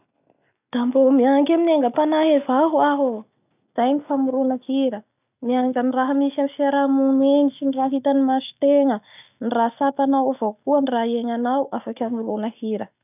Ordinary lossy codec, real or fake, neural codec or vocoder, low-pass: none; real; none; 3.6 kHz